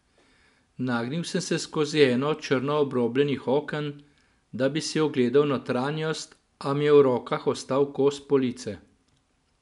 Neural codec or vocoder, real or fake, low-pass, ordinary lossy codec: none; real; 10.8 kHz; none